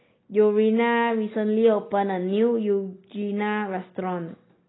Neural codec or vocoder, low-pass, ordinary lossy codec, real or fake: none; 7.2 kHz; AAC, 16 kbps; real